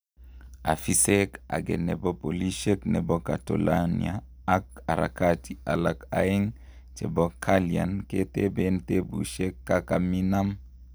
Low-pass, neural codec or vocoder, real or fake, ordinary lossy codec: none; none; real; none